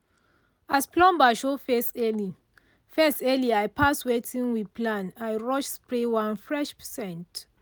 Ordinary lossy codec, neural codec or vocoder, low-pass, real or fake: none; none; none; real